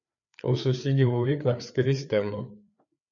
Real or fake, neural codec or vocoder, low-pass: fake; codec, 16 kHz, 4 kbps, FreqCodec, larger model; 7.2 kHz